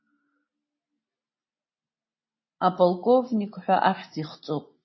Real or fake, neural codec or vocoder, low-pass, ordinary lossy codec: real; none; 7.2 kHz; MP3, 24 kbps